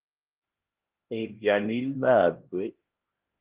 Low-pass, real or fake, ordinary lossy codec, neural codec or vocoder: 3.6 kHz; fake; Opus, 16 kbps; codec, 16 kHz, 1 kbps, X-Codec, HuBERT features, trained on LibriSpeech